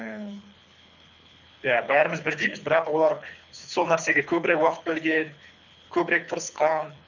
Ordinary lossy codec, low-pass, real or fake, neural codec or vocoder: none; 7.2 kHz; fake; codec, 24 kHz, 3 kbps, HILCodec